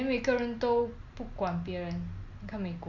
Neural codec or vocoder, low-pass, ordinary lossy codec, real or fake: none; 7.2 kHz; Opus, 64 kbps; real